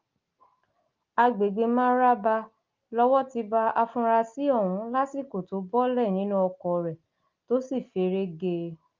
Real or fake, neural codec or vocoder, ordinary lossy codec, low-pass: real; none; Opus, 24 kbps; 7.2 kHz